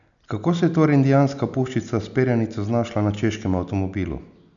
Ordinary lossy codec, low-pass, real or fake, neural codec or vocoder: none; 7.2 kHz; real; none